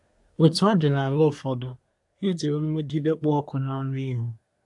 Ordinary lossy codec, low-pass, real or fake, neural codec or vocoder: AAC, 64 kbps; 10.8 kHz; fake; codec, 24 kHz, 1 kbps, SNAC